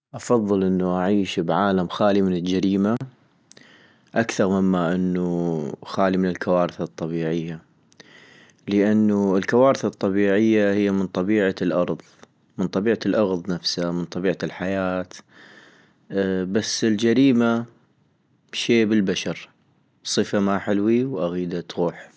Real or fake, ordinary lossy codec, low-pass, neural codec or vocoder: real; none; none; none